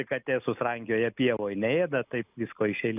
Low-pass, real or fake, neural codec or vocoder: 3.6 kHz; real; none